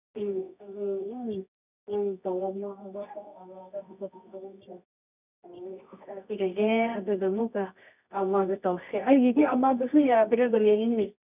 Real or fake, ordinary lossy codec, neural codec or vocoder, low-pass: fake; none; codec, 24 kHz, 0.9 kbps, WavTokenizer, medium music audio release; 3.6 kHz